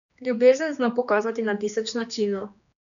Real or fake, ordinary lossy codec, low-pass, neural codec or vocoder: fake; none; 7.2 kHz; codec, 16 kHz, 2 kbps, X-Codec, HuBERT features, trained on general audio